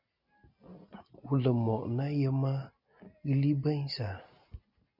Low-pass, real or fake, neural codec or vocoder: 5.4 kHz; real; none